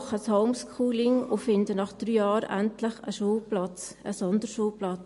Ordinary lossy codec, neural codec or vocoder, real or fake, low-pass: MP3, 48 kbps; none; real; 14.4 kHz